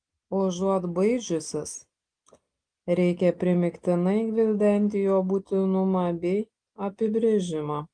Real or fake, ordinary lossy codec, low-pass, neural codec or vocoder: real; Opus, 24 kbps; 9.9 kHz; none